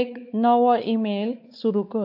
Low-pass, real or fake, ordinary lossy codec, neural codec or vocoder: 5.4 kHz; fake; AAC, 48 kbps; codec, 16 kHz, 4 kbps, X-Codec, WavLM features, trained on Multilingual LibriSpeech